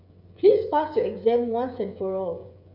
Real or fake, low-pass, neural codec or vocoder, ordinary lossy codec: fake; 5.4 kHz; codec, 16 kHz, 16 kbps, FreqCodec, smaller model; none